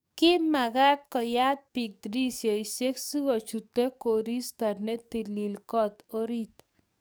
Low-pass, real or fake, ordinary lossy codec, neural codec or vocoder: none; fake; none; codec, 44.1 kHz, 7.8 kbps, DAC